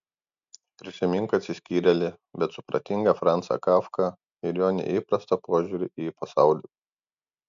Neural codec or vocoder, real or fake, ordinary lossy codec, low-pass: none; real; MP3, 64 kbps; 7.2 kHz